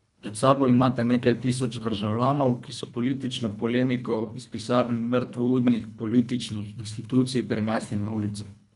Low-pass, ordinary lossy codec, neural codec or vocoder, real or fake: 10.8 kHz; none; codec, 24 kHz, 1.5 kbps, HILCodec; fake